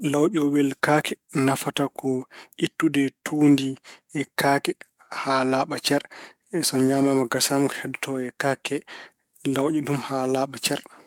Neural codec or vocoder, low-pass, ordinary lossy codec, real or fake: codec, 44.1 kHz, 7.8 kbps, DAC; 19.8 kHz; MP3, 96 kbps; fake